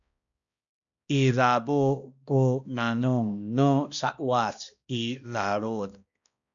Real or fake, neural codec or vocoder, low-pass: fake; codec, 16 kHz, 1 kbps, X-Codec, HuBERT features, trained on balanced general audio; 7.2 kHz